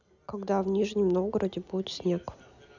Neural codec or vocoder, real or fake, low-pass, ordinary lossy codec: none; real; 7.2 kHz; none